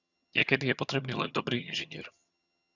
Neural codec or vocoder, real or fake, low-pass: vocoder, 22.05 kHz, 80 mel bands, HiFi-GAN; fake; 7.2 kHz